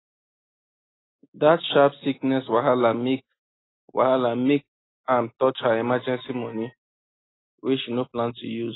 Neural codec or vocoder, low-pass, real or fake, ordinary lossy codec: none; 7.2 kHz; real; AAC, 16 kbps